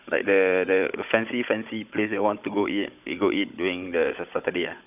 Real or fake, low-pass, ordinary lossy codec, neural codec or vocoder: fake; 3.6 kHz; none; codec, 16 kHz, 16 kbps, FunCodec, trained on Chinese and English, 50 frames a second